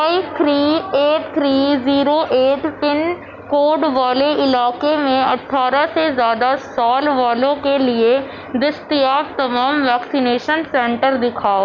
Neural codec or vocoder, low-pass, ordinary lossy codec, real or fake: none; 7.2 kHz; none; real